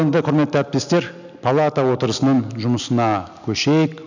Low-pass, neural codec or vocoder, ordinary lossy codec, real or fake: 7.2 kHz; none; none; real